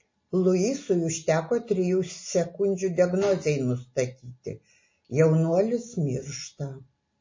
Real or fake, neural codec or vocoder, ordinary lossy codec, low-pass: real; none; MP3, 32 kbps; 7.2 kHz